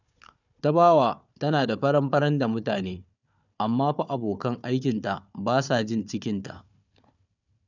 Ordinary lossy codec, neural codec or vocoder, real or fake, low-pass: none; codec, 16 kHz, 4 kbps, FunCodec, trained on Chinese and English, 50 frames a second; fake; 7.2 kHz